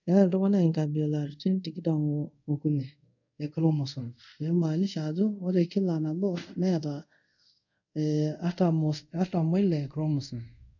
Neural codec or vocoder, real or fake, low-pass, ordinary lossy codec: codec, 24 kHz, 0.5 kbps, DualCodec; fake; 7.2 kHz; none